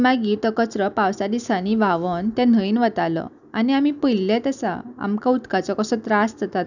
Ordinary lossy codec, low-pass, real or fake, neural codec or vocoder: none; 7.2 kHz; real; none